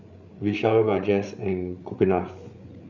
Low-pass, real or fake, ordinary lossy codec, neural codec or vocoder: 7.2 kHz; fake; none; codec, 16 kHz, 8 kbps, FreqCodec, larger model